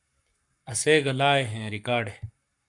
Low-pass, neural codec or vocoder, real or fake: 10.8 kHz; codec, 44.1 kHz, 7.8 kbps, Pupu-Codec; fake